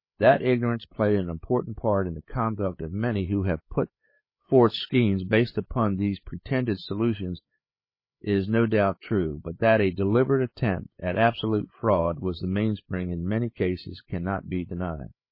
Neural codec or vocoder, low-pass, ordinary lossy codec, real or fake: codec, 16 kHz, 8 kbps, FreqCodec, larger model; 5.4 kHz; MP3, 24 kbps; fake